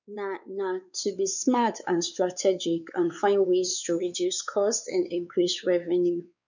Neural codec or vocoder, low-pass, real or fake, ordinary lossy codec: codec, 16 kHz, 4 kbps, X-Codec, WavLM features, trained on Multilingual LibriSpeech; 7.2 kHz; fake; none